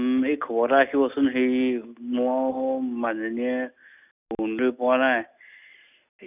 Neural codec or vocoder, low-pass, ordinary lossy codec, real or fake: none; 3.6 kHz; none; real